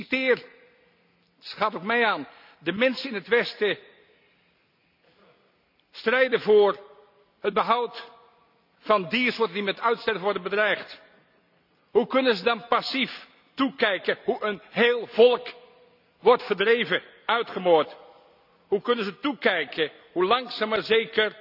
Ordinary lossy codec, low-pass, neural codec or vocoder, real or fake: none; 5.4 kHz; none; real